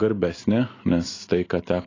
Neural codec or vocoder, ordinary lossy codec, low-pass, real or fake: none; AAC, 32 kbps; 7.2 kHz; real